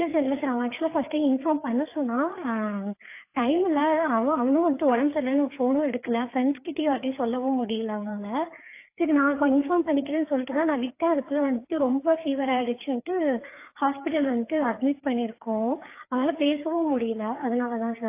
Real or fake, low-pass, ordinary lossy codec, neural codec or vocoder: fake; 3.6 kHz; AAC, 24 kbps; codec, 16 kHz, 4 kbps, FreqCodec, larger model